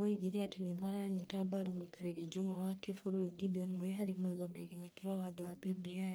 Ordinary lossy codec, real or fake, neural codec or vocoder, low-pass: none; fake; codec, 44.1 kHz, 1.7 kbps, Pupu-Codec; none